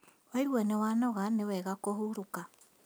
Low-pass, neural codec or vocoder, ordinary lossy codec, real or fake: none; none; none; real